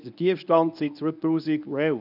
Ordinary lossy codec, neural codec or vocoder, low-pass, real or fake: none; codec, 24 kHz, 0.9 kbps, WavTokenizer, medium speech release version 1; 5.4 kHz; fake